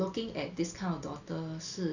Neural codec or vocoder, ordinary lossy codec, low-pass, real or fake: none; none; 7.2 kHz; real